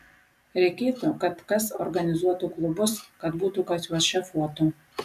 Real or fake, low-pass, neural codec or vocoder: fake; 14.4 kHz; vocoder, 44.1 kHz, 128 mel bands every 256 samples, BigVGAN v2